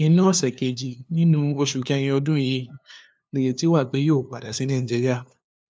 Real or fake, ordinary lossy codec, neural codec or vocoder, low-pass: fake; none; codec, 16 kHz, 2 kbps, FunCodec, trained on LibriTTS, 25 frames a second; none